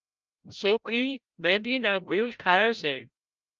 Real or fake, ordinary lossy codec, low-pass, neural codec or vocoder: fake; Opus, 24 kbps; 7.2 kHz; codec, 16 kHz, 0.5 kbps, FreqCodec, larger model